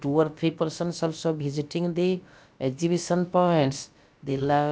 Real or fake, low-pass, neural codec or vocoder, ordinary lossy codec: fake; none; codec, 16 kHz, about 1 kbps, DyCAST, with the encoder's durations; none